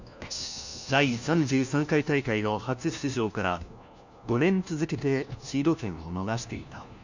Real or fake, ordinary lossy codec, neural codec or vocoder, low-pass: fake; none; codec, 16 kHz, 1 kbps, FunCodec, trained on LibriTTS, 50 frames a second; 7.2 kHz